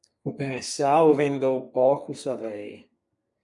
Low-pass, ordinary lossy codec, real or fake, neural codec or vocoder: 10.8 kHz; MP3, 64 kbps; fake; codec, 32 kHz, 1.9 kbps, SNAC